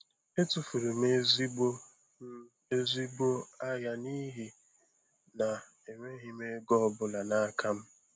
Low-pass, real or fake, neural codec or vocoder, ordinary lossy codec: none; real; none; none